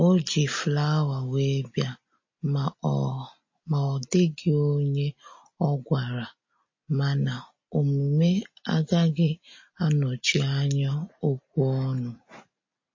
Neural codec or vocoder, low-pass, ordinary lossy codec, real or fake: none; 7.2 kHz; MP3, 32 kbps; real